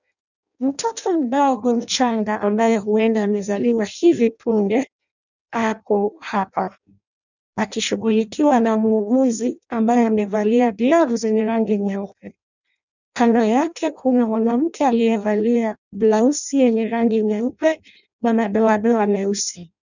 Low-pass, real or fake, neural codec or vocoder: 7.2 kHz; fake; codec, 16 kHz in and 24 kHz out, 0.6 kbps, FireRedTTS-2 codec